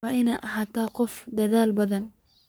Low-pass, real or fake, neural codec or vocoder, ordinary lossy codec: none; fake; codec, 44.1 kHz, 3.4 kbps, Pupu-Codec; none